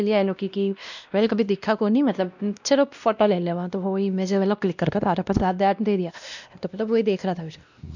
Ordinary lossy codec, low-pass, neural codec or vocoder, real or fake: none; 7.2 kHz; codec, 16 kHz, 1 kbps, X-Codec, WavLM features, trained on Multilingual LibriSpeech; fake